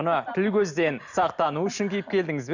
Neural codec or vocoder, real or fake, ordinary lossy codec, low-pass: none; real; none; 7.2 kHz